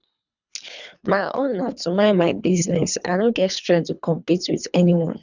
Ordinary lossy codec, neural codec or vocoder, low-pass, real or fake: none; codec, 24 kHz, 3 kbps, HILCodec; 7.2 kHz; fake